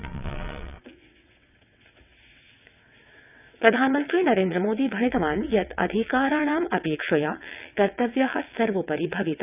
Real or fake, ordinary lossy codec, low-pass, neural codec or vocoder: fake; none; 3.6 kHz; vocoder, 22.05 kHz, 80 mel bands, WaveNeXt